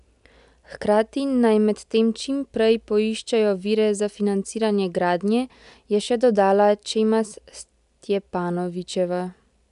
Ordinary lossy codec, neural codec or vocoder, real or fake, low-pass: none; none; real; 10.8 kHz